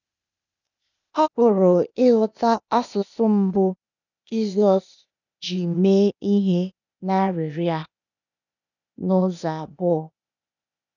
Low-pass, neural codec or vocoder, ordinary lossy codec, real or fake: 7.2 kHz; codec, 16 kHz, 0.8 kbps, ZipCodec; none; fake